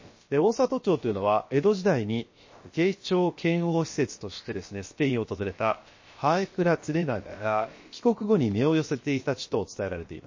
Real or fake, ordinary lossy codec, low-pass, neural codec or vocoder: fake; MP3, 32 kbps; 7.2 kHz; codec, 16 kHz, about 1 kbps, DyCAST, with the encoder's durations